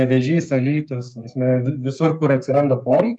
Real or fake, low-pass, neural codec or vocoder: fake; 10.8 kHz; codec, 32 kHz, 1.9 kbps, SNAC